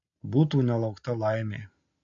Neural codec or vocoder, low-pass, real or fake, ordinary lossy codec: none; 7.2 kHz; real; MP3, 48 kbps